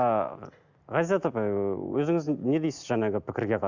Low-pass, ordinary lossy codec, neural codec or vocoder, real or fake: 7.2 kHz; Opus, 64 kbps; none; real